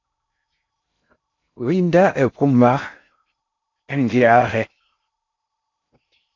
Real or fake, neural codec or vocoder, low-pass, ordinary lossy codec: fake; codec, 16 kHz in and 24 kHz out, 0.6 kbps, FocalCodec, streaming, 2048 codes; 7.2 kHz; AAC, 48 kbps